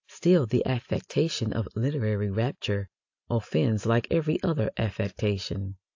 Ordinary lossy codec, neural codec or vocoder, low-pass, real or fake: AAC, 48 kbps; none; 7.2 kHz; real